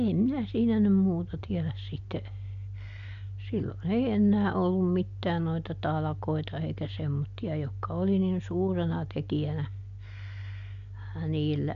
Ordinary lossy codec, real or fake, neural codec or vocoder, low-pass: MP3, 64 kbps; real; none; 7.2 kHz